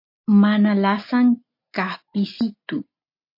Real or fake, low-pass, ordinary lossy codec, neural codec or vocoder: real; 5.4 kHz; MP3, 32 kbps; none